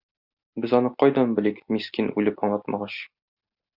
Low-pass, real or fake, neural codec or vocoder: 5.4 kHz; real; none